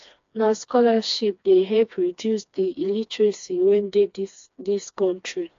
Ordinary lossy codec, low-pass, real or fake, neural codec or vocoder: AAC, 48 kbps; 7.2 kHz; fake; codec, 16 kHz, 2 kbps, FreqCodec, smaller model